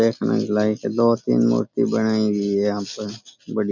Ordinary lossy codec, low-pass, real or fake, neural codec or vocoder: none; 7.2 kHz; real; none